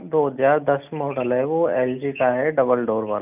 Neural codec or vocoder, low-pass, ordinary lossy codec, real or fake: none; 3.6 kHz; none; real